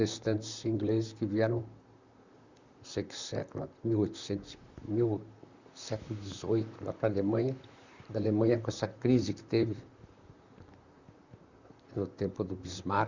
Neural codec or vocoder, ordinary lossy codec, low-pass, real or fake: vocoder, 44.1 kHz, 128 mel bands, Pupu-Vocoder; Opus, 64 kbps; 7.2 kHz; fake